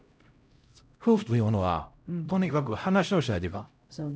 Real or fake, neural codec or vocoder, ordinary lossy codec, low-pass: fake; codec, 16 kHz, 0.5 kbps, X-Codec, HuBERT features, trained on LibriSpeech; none; none